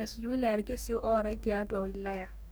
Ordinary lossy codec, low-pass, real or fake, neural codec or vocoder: none; none; fake; codec, 44.1 kHz, 2.6 kbps, DAC